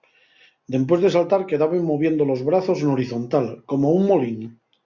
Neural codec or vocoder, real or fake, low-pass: none; real; 7.2 kHz